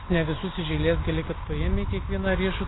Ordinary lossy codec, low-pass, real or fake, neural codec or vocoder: AAC, 16 kbps; 7.2 kHz; real; none